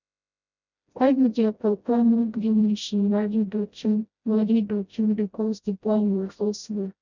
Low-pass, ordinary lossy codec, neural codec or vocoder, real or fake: 7.2 kHz; none; codec, 16 kHz, 0.5 kbps, FreqCodec, smaller model; fake